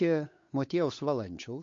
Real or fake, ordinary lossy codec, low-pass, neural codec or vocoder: fake; MP3, 48 kbps; 7.2 kHz; codec, 16 kHz, 8 kbps, FunCodec, trained on Chinese and English, 25 frames a second